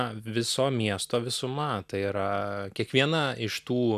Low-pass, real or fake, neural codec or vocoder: 14.4 kHz; real; none